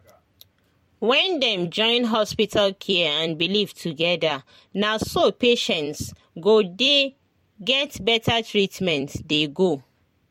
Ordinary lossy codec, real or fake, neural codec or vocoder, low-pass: MP3, 64 kbps; fake; vocoder, 44.1 kHz, 128 mel bands every 256 samples, BigVGAN v2; 19.8 kHz